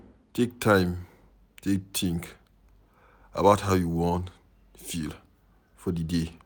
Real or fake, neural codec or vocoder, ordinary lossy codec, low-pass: real; none; none; none